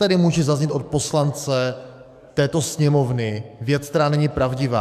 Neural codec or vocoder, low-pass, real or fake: codec, 44.1 kHz, 7.8 kbps, DAC; 14.4 kHz; fake